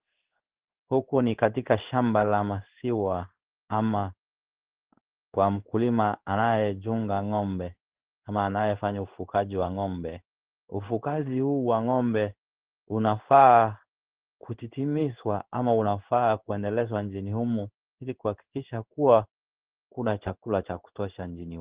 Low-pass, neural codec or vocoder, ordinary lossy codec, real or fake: 3.6 kHz; codec, 16 kHz in and 24 kHz out, 1 kbps, XY-Tokenizer; Opus, 16 kbps; fake